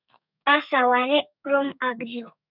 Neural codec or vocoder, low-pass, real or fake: codec, 32 kHz, 1.9 kbps, SNAC; 5.4 kHz; fake